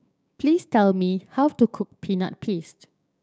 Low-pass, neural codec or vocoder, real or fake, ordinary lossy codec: none; codec, 16 kHz, 6 kbps, DAC; fake; none